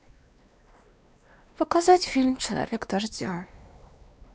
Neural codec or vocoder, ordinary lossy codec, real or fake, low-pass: codec, 16 kHz, 2 kbps, X-Codec, WavLM features, trained on Multilingual LibriSpeech; none; fake; none